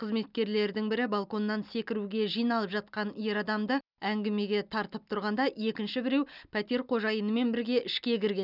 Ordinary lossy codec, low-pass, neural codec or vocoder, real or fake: none; 5.4 kHz; none; real